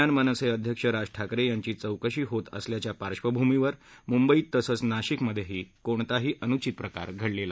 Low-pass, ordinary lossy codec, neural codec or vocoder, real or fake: none; none; none; real